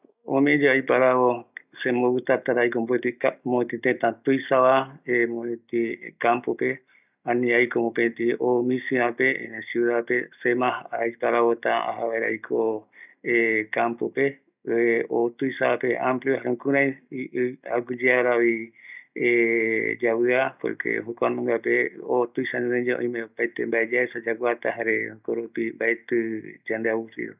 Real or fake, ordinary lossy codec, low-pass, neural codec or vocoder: real; none; 3.6 kHz; none